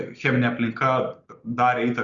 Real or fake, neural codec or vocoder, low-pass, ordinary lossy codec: real; none; 7.2 kHz; Opus, 64 kbps